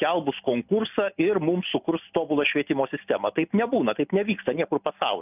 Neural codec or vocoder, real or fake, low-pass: none; real; 3.6 kHz